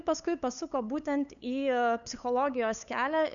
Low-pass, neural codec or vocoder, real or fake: 7.2 kHz; codec, 16 kHz, 8 kbps, FunCodec, trained on LibriTTS, 25 frames a second; fake